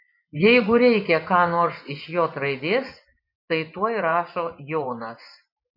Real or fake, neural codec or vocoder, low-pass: fake; vocoder, 24 kHz, 100 mel bands, Vocos; 5.4 kHz